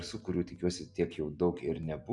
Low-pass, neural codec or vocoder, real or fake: 10.8 kHz; none; real